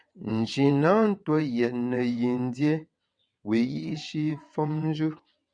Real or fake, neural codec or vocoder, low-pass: fake; vocoder, 22.05 kHz, 80 mel bands, WaveNeXt; 9.9 kHz